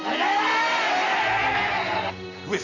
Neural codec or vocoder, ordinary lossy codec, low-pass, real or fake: none; none; 7.2 kHz; real